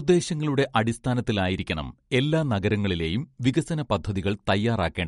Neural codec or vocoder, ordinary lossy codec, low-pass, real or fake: none; MP3, 48 kbps; 19.8 kHz; real